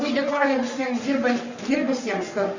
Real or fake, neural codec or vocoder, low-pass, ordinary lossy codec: fake; codec, 44.1 kHz, 3.4 kbps, Pupu-Codec; 7.2 kHz; Opus, 64 kbps